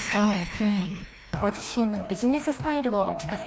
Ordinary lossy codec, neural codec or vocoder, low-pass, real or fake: none; codec, 16 kHz, 1 kbps, FreqCodec, larger model; none; fake